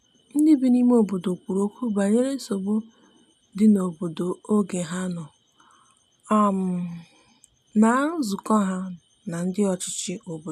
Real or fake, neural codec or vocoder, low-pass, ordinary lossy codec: real; none; 14.4 kHz; none